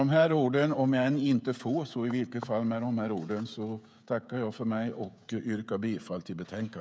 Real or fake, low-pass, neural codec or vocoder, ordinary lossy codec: fake; none; codec, 16 kHz, 16 kbps, FreqCodec, smaller model; none